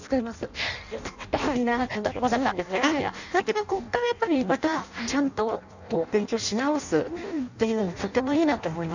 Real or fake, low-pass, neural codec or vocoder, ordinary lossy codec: fake; 7.2 kHz; codec, 16 kHz in and 24 kHz out, 0.6 kbps, FireRedTTS-2 codec; none